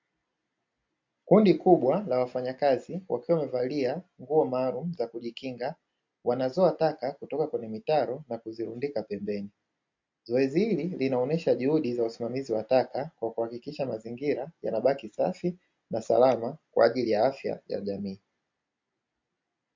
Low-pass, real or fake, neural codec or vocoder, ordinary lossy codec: 7.2 kHz; real; none; MP3, 48 kbps